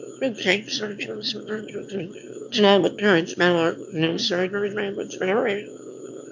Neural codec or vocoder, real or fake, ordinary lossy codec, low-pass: autoencoder, 22.05 kHz, a latent of 192 numbers a frame, VITS, trained on one speaker; fake; MP3, 64 kbps; 7.2 kHz